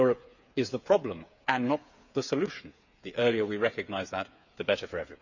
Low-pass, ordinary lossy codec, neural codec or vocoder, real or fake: 7.2 kHz; none; codec, 16 kHz, 8 kbps, FreqCodec, smaller model; fake